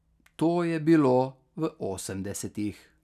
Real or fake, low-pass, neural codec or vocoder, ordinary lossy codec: real; 14.4 kHz; none; none